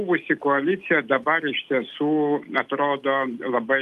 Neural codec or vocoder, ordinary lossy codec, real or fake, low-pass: none; Opus, 32 kbps; real; 14.4 kHz